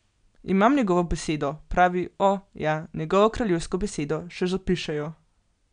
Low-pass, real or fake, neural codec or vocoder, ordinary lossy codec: 9.9 kHz; real; none; none